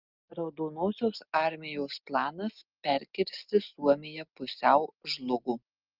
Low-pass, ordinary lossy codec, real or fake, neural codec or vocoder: 5.4 kHz; Opus, 24 kbps; real; none